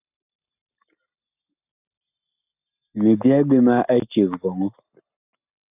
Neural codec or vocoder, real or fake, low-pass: none; real; 3.6 kHz